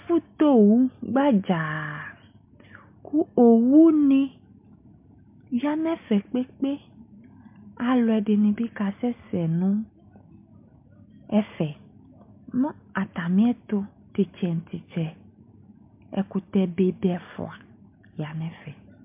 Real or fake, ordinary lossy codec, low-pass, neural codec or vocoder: real; MP3, 32 kbps; 3.6 kHz; none